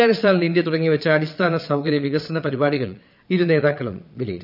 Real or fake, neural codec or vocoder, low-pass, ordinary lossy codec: fake; vocoder, 22.05 kHz, 80 mel bands, Vocos; 5.4 kHz; none